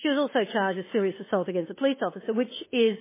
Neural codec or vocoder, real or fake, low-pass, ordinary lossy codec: autoencoder, 48 kHz, 32 numbers a frame, DAC-VAE, trained on Japanese speech; fake; 3.6 kHz; MP3, 16 kbps